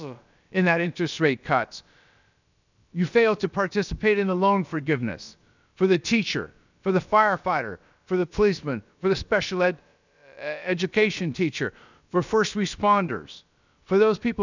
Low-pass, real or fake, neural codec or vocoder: 7.2 kHz; fake; codec, 16 kHz, about 1 kbps, DyCAST, with the encoder's durations